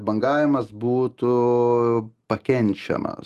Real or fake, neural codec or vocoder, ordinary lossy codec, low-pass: real; none; Opus, 24 kbps; 14.4 kHz